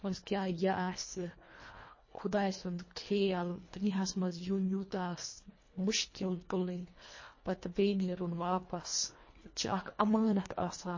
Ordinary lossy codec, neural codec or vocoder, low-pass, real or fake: MP3, 32 kbps; codec, 24 kHz, 1.5 kbps, HILCodec; 7.2 kHz; fake